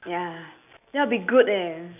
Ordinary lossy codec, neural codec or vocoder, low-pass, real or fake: none; none; 3.6 kHz; real